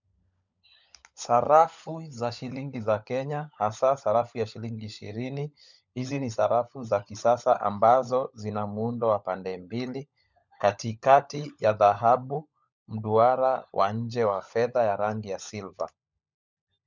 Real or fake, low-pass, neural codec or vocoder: fake; 7.2 kHz; codec, 16 kHz, 16 kbps, FunCodec, trained on LibriTTS, 50 frames a second